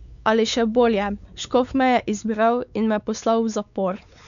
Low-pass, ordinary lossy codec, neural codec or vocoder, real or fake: 7.2 kHz; none; codec, 16 kHz, 4 kbps, X-Codec, WavLM features, trained on Multilingual LibriSpeech; fake